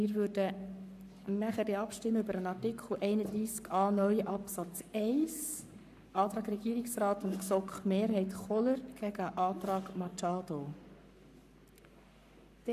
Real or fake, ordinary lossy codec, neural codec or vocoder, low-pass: fake; AAC, 96 kbps; codec, 44.1 kHz, 7.8 kbps, Pupu-Codec; 14.4 kHz